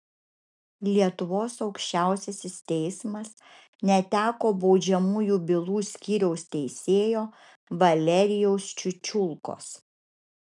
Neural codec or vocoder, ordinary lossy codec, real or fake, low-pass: none; MP3, 96 kbps; real; 10.8 kHz